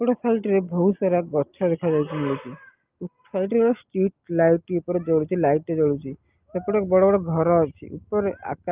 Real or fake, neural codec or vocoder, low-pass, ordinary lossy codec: real; none; 3.6 kHz; Opus, 24 kbps